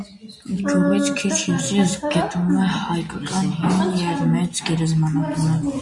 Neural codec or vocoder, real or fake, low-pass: none; real; 10.8 kHz